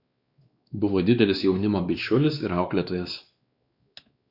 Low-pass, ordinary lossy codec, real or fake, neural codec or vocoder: 5.4 kHz; Opus, 64 kbps; fake; codec, 16 kHz, 2 kbps, X-Codec, WavLM features, trained on Multilingual LibriSpeech